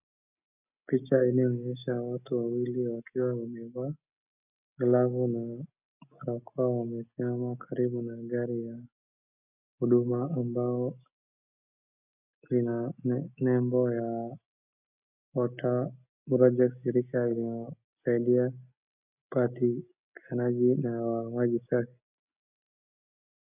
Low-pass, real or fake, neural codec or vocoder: 3.6 kHz; real; none